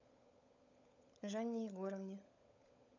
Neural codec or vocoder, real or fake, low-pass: codec, 16 kHz, 16 kbps, FunCodec, trained on LibriTTS, 50 frames a second; fake; 7.2 kHz